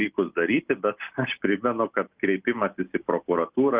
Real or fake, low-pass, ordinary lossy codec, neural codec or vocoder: real; 3.6 kHz; Opus, 24 kbps; none